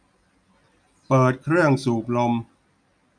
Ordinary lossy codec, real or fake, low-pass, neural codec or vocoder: none; real; 9.9 kHz; none